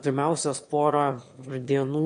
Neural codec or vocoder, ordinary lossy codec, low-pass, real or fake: autoencoder, 22.05 kHz, a latent of 192 numbers a frame, VITS, trained on one speaker; MP3, 48 kbps; 9.9 kHz; fake